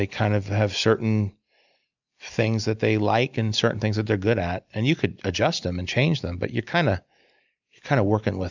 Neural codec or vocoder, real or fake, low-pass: none; real; 7.2 kHz